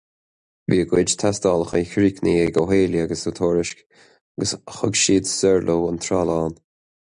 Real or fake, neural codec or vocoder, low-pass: real; none; 9.9 kHz